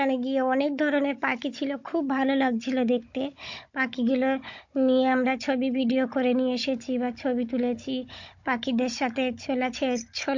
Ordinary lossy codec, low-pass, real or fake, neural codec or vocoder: MP3, 48 kbps; 7.2 kHz; fake; codec, 16 kHz, 16 kbps, FunCodec, trained on Chinese and English, 50 frames a second